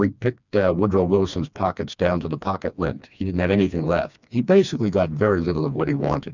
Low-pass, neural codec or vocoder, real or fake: 7.2 kHz; codec, 16 kHz, 2 kbps, FreqCodec, smaller model; fake